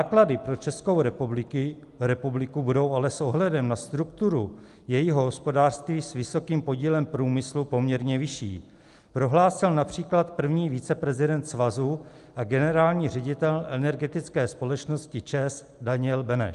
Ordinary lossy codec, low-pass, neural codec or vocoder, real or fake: Opus, 32 kbps; 10.8 kHz; none; real